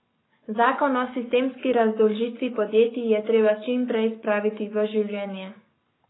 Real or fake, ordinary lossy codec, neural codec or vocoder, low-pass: fake; AAC, 16 kbps; codec, 44.1 kHz, 7.8 kbps, Pupu-Codec; 7.2 kHz